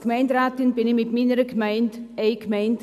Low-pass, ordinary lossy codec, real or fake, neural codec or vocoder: 14.4 kHz; none; real; none